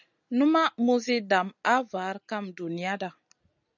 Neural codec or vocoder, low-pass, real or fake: none; 7.2 kHz; real